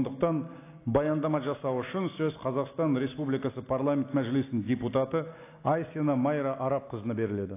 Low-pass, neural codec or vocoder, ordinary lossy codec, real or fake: 3.6 kHz; none; AAC, 24 kbps; real